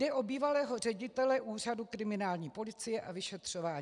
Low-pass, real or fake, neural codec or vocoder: 10.8 kHz; real; none